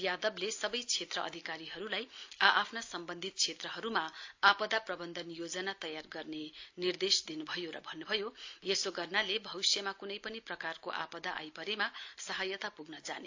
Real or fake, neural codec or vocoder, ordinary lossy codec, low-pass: real; none; AAC, 48 kbps; 7.2 kHz